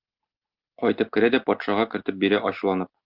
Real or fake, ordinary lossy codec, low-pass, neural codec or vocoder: real; Opus, 32 kbps; 5.4 kHz; none